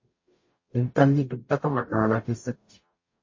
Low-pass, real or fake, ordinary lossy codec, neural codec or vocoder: 7.2 kHz; fake; MP3, 32 kbps; codec, 44.1 kHz, 0.9 kbps, DAC